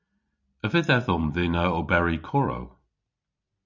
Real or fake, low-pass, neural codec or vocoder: real; 7.2 kHz; none